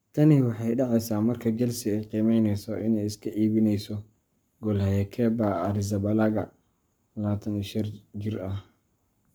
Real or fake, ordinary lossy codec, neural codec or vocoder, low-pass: fake; none; codec, 44.1 kHz, 7.8 kbps, Pupu-Codec; none